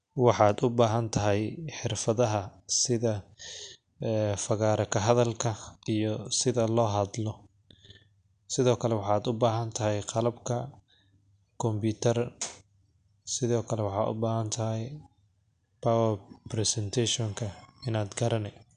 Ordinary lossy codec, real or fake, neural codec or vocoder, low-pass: none; real; none; 9.9 kHz